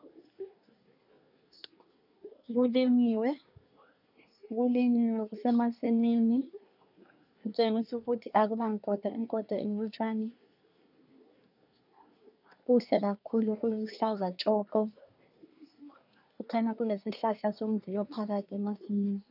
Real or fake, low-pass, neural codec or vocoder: fake; 5.4 kHz; codec, 24 kHz, 1 kbps, SNAC